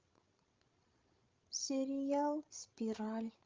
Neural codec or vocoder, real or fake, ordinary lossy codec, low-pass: codec, 16 kHz, 16 kbps, FreqCodec, larger model; fake; Opus, 32 kbps; 7.2 kHz